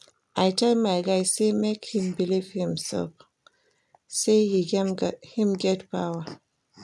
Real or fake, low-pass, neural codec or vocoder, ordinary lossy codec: real; none; none; none